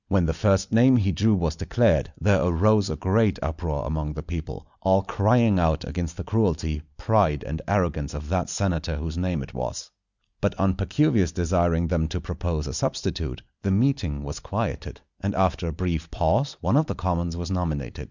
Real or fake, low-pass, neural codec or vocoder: real; 7.2 kHz; none